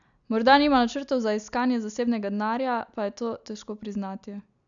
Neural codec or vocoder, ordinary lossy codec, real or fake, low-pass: none; none; real; 7.2 kHz